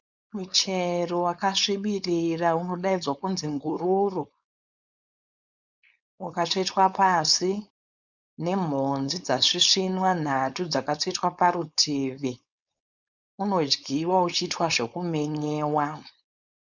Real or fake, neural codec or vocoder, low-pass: fake; codec, 16 kHz, 4.8 kbps, FACodec; 7.2 kHz